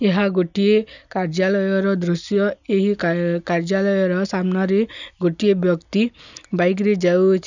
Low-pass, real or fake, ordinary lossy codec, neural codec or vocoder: 7.2 kHz; real; none; none